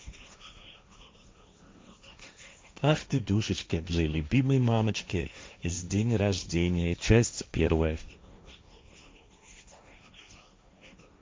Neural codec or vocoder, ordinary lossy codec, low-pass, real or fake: codec, 16 kHz, 1.1 kbps, Voila-Tokenizer; none; none; fake